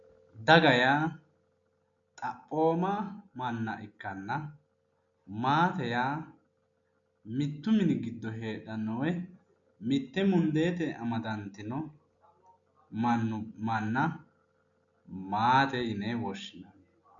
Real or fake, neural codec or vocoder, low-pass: real; none; 7.2 kHz